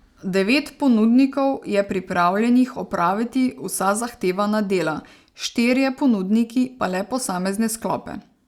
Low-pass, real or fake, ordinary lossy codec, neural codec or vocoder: 19.8 kHz; real; Opus, 64 kbps; none